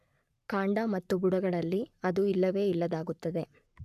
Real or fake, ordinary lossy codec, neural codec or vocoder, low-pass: fake; none; codec, 44.1 kHz, 7.8 kbps, Pupu-Codec; 14.4 kHz